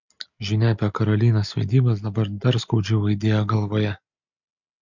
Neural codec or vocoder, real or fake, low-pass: none; real; 7.2 kHz